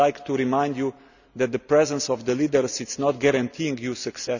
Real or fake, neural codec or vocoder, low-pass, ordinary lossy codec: real; none; 7.2 kHz; none